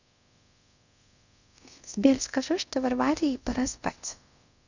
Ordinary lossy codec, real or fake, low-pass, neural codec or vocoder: none; fake; 7.2 kHz; codec, 24 kHz, 0.5 kbps, DualCodec